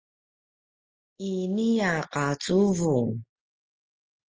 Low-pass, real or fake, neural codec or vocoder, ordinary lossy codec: 7.2 kHz; real; none; Opus, 24 kbps